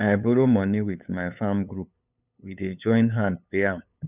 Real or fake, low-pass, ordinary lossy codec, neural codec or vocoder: fake; 3.6 kHz; none; codec, 16 kHz, 8 kbps, FunCodec, trained on LibriTTS, 25 frames a second